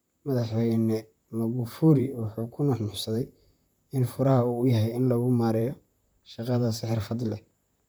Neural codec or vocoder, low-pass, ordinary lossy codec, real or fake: vocoder, 44.1 kHz, 128 mel bands, Pupu-Vocoder; none; none; fake